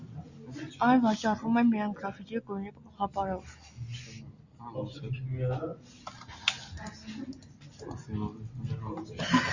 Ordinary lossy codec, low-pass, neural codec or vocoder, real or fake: Opus, 64 kbps; 7.2 kHz; none; real